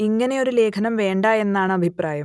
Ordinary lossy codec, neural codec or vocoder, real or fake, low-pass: none; none; real; none